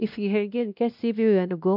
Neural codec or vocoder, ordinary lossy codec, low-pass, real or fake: codec, 16 kHz, 1 kbps, X-Codec, WavLM features, trained on Multilingual LibriSpeech; none; 5.4 kHz; fake